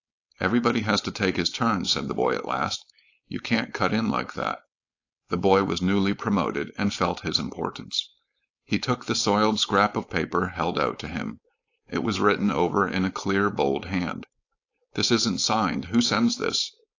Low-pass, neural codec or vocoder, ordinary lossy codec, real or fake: 7.2 kHz; codec, 16 kHz, 4.8 kbps, FACodec; AAC, 48 kbps; fake